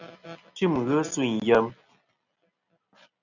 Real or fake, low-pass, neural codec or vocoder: real; 7.2 kHz; none